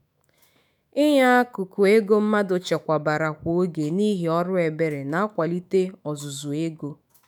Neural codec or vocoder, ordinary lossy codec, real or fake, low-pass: autoencoder, 48 kHz, 128 numbers a frame, DAC-VAE, trained on Japanese speech; none; fake; none